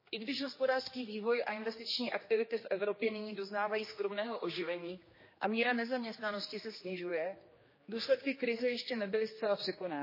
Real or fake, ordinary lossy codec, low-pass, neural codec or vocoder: fake; MP3, 24 kbps; 5.4 kHz; codec, 16 kHz, 2 kbps, X-Codec, HuBERT features, trained on general audio